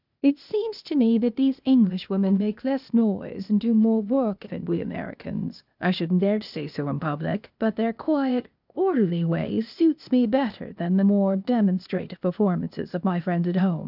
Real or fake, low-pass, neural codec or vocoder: fake; 5.4 kHz; codec, 16 kHz, 0.8 kbps, ZipCodec